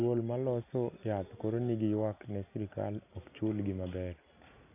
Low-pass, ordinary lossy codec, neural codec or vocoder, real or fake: 3.6 kHz; none; none; real